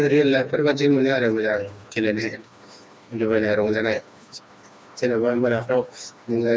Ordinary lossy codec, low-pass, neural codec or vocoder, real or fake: none; none; codec, 16 kHz, 2 kbps, FreqCodec, smaller model; fake